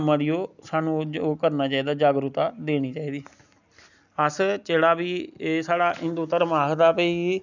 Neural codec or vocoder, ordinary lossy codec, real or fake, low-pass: none; none; real; 7.2 kHz